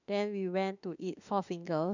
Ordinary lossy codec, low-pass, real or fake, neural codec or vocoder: none; 7.2 kHz; fake; autoencoder, 48 kHz, 32 numbers a frame, DAC-VAE, trained on Japanese speech